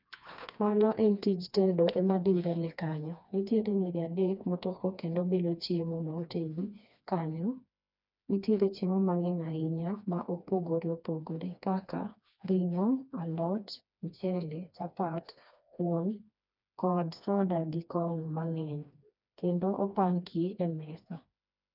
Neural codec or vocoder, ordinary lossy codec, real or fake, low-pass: codec, 16 kHz, 2 kbps, FreqCodec, smaller model; none; fake; 5.4 kHz